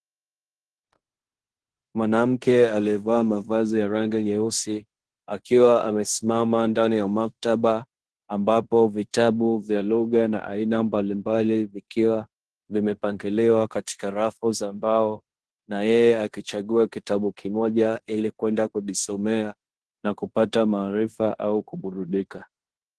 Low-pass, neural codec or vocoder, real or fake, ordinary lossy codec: 10.8 kHz; codec, 16 kHz in and 24 kHz out, 0.9 kbps, LongCat-Audio-Codec, fine tuned four codebook decoder; fake; Opus, 16 kbps